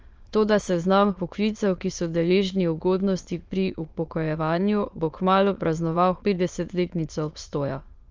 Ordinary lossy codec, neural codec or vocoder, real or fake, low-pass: Opus, 24 kbps; autoencoder, 22.05 kHz, a latent of 192 numbers a frame, VITS, trained on many speakers; fake; 7.2 kHz